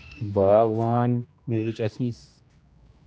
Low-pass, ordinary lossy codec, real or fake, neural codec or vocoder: none; none; fake; codec, 16 kHz, 1 kbps, X-Codec, HuBERT features, trained on general audio